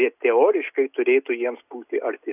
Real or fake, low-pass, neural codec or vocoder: real; 3.6 kHz; none